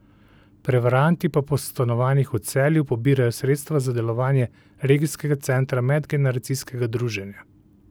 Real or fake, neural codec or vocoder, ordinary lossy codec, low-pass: real; none; none; none